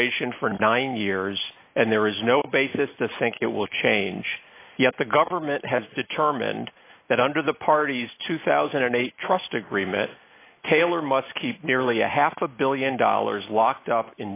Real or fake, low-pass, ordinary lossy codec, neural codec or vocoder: real; 3.6 kHz; AAC, 24 kbps; none